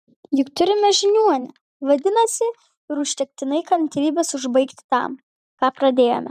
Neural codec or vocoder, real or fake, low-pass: vocoder, 44.1 kHz, 128 mel bands every 512 samples, BigVGAN v2; fake; 14.4 kHz